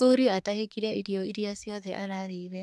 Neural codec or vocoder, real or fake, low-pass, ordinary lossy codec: codec, 24 kHz, 1 kbps, SNAC; fake; none; none